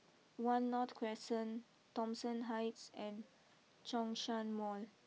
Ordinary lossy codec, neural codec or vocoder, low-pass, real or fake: none; none; none; real